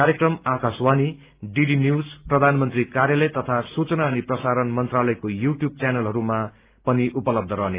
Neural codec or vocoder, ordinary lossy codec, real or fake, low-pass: none; Opus, 24 kbps; real; 3.6 kHz